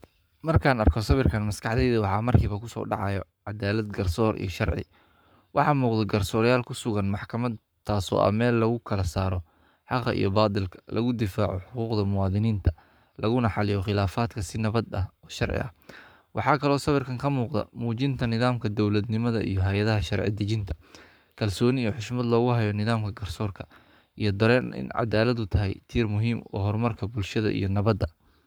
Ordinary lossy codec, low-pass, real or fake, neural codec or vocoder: none; none; fake; codec, 44.1 kHz, 7.8 kbps, Pupu-Codec